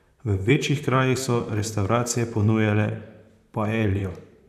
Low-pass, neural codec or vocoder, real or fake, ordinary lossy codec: 14.4 kHz; vocoder, 44.1 kHz, 128 mel bands, Pupu-Vocoder; fake; none